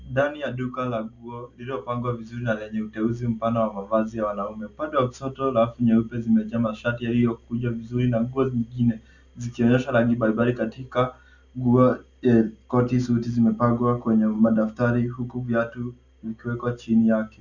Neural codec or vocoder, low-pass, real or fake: none; 7.2 kHz; real